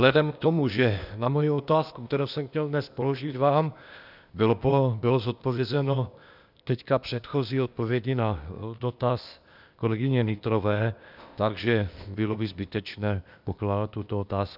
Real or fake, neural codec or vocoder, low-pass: fake; codec, 16 kHz, 0.8 kbps, ZipCodec; 5.4 kHz